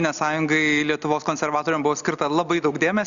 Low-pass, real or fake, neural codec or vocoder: 7.2 kHz; real; none